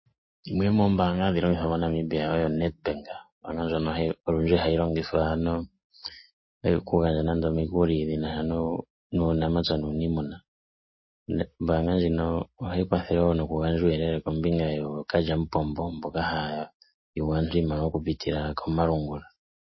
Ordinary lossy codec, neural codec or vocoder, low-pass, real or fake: MP3, 24 kbps; none; 7.2 kHz; real